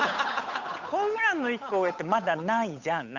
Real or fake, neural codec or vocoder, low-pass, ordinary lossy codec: fake; codec, 16 kHz, 8 kbps, FunCodec, trained on Chinese and English, 25 frames a second; 7.2 kHz; none